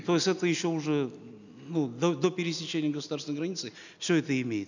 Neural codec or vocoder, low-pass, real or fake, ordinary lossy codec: none; 7.2 kHz; real; none